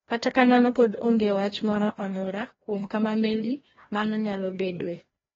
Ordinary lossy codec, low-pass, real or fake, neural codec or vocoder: AAC, 24 kbps; 7.2 kHz; fake; codec, 16 kHz, 1 kbps, FreqCodec, larger model